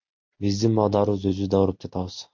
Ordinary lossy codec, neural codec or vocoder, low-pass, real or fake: MP3, 48 kbps; none; 7.2 kHz; real